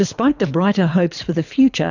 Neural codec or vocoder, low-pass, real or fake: codec, 24 kHz, 6 kbps, HILCodec; 7.2 kHz; fake